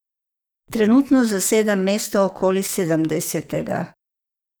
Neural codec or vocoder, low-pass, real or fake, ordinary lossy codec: codec, 44.1 kHz, 2.6 kbps, SNAC; none; fake; none